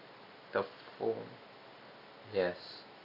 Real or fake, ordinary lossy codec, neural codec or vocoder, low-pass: real; none; none; 5.4 kHz